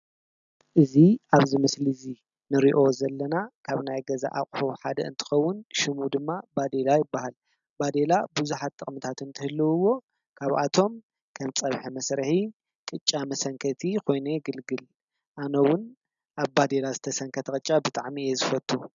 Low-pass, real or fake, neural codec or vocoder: 7.2 kHz; real; none